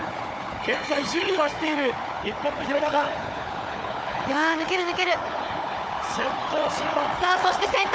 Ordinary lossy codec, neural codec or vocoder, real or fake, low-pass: none; codec, 16 kHz, 4 kbps, FunCodec, trained on Chinese and English, 50 frames a second; fake; none